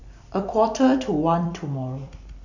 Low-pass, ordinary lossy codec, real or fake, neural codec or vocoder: 7.2 kHz; none; fake; vocoder, 44.1 kHz, 128 mel bands every 256 samples, BigVGAN v2